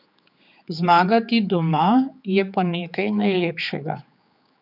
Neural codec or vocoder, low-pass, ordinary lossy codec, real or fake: codec, 16 kHz, 4 kbps, X-Codec, HuBERT features, trained on general audio; 5.4 kHz; none; fake